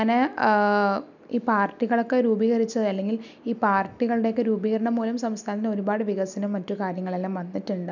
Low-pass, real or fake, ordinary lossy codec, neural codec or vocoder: 7.2 kHz; real; none; none